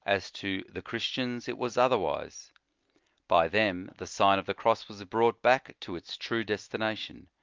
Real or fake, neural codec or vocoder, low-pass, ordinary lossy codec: real; none; 7.2 kHz; Opus, 24 kbps